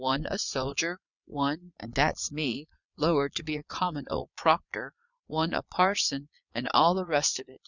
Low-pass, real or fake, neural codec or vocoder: 7.2 kHz; fake; vocoder, 22.05 kHz, 80 mel bands, Vocos